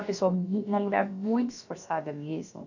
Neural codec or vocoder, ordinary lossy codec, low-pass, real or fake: codec, 16 kHz, about 1 kbps, DyCAST, with the encoder's durations; none; 7.2 kHz; fake